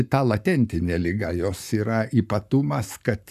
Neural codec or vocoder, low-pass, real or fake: codec, 44.1 kHz, 7.8 kbps, Pupu-Codec; 14.4 kHz; fake